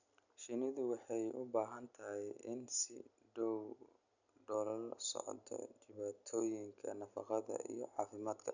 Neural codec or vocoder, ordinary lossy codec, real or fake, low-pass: none; none; real; 7.2 kHz